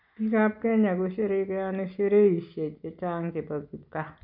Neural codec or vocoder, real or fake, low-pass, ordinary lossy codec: none; real; 5.4 kHz; none